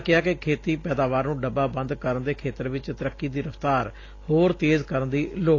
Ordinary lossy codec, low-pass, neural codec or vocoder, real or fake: AAC, 32 kbps; 7.2 kHz; none; real